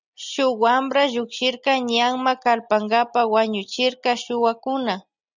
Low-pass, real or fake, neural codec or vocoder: 7.2 kHz; real; none